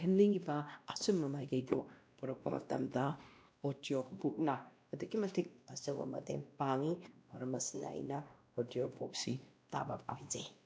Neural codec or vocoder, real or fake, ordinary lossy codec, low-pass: codec, 16 kHz, 1 kbps, X-Codec, WavLM features, trained on Multilingual LibriSpeech; fake; none; none